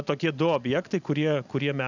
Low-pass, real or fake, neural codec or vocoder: 7.2 kHz; real; none